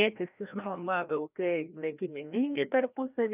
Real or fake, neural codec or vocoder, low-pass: fake; codec, 16 kHz, 1 kbps, FreqCodec, larger model; 3.6 kHz